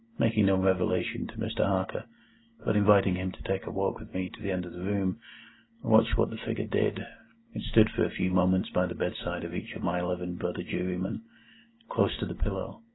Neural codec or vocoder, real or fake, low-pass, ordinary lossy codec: none; real; 7.2 kHz; AAC, 16 kbps